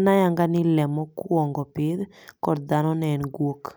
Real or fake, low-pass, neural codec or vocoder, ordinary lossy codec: real; none; none; none